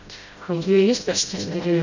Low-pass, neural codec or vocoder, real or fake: 7.2 kHz; codec, 16 kHz, 0.5 kbps, FreqCodec, smaller model; fake